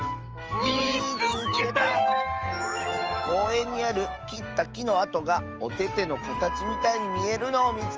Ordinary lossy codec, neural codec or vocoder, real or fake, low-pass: Opus, 24 kbps; autoencoder, 48 kHz, 128 numbers a frame, DAC-VAE, trained on Japanese speech; fake; 7.2 kHz